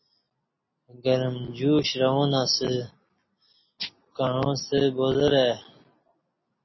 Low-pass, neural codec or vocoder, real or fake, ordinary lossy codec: 7.2 kHz; none; real; MP3, 24 kbps